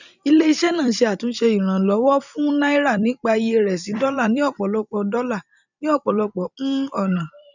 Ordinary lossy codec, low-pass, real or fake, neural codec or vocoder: none; 7.2 kHz; fake; vocoder, 44.1 kHz, 128 mel bands every 256 samples, BigVGAN v2